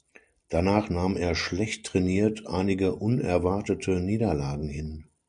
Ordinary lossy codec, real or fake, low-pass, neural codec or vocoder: MP3, 64 kbps; real; 9.9 kHz; none